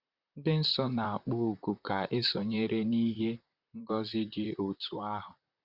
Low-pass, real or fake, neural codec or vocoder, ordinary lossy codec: 5.4 kHz; fake; vocoder, 44.1 kHz, 128 mel bands, Pupu-Vocoder; Opus, 64 kbps